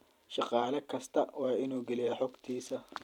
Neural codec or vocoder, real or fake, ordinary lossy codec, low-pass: vocoder, 44.1 kHz, 128 mel bands every 512 samples, BigVGAN v2; fake; none; 19.8 kHz